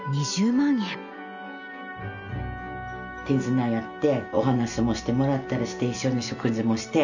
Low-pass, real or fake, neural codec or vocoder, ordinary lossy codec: 7.2 kHz; real; none; none